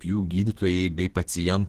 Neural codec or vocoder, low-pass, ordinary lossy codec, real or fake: codec, 32 kHz, 1.9 kbps, SNAC; 14.4 kHz; Opus, 16 kbps; fake